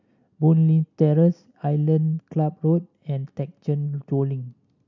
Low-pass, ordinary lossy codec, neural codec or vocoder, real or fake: 7.2 kHz; none; none; real